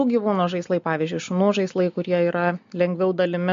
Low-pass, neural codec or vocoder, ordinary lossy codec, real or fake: 7.2 kHz; none; MP3, 48 kbps; real